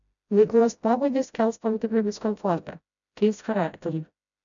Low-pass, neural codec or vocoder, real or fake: 7.2 kHz; codec, 16 kHz, 0.5 kbps, FreqCodec, smaller model; fake